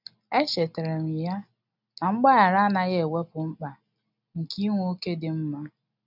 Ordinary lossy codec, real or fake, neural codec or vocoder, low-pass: none; real; none; 5.4 kHz